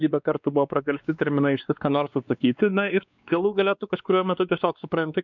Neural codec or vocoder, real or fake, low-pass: codec, 16 kHz, 2 kbps, X-Codec, WavLM features, trained on Multilingual LibriSpeech; fake; 7.2 kHz